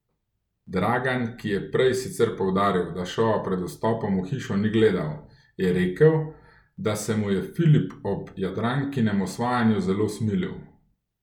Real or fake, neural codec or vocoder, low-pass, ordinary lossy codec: real; none; 19.8 kHz; none